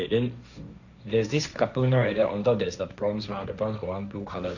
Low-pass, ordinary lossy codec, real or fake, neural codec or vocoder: 7.2 kHz; none; fake; codec, 16 kHz, 1.1 kbps, Voila-Tokenizer